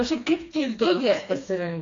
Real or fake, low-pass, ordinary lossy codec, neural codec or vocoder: fake; 7.2 kHz; AAC, 48 kbps; codec, 16 kHz, 2 kbps, FreqCodec, smaller model